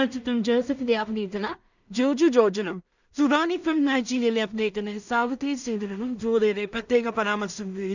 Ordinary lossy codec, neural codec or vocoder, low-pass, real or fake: none; codec, 16 kHz in and 24 kHz out, 0.4 kbps, LongCat-Audio-Codec, two codebook decoder; 7.2 kHz; fake